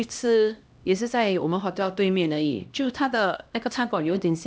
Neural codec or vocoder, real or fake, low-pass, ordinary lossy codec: codec, 16 kHz, 1 kbps, X-Codec, HuBERT features, trained on LibriSpeech; fake; none; none